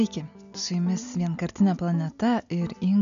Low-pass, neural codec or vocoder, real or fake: 7.2 kHz; none; real